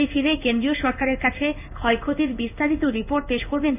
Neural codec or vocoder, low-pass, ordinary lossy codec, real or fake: codec, 16 kHz in and 24 kHz out, 1 kbps, XY-Tokenizer; 3.6 kHz; none; fake